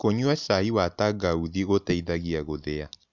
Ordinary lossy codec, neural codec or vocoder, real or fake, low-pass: none; none; real; 7.2 kHz